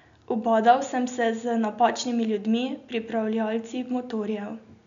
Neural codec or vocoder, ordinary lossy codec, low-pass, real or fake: none; none; 7.2 kHz; real